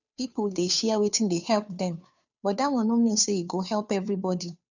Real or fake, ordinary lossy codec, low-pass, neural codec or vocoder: fake; none; 7.2 kHz; codec, 16 kHz, 2 kbps, FunCodec, trained on Chinese and English, 25 frames a second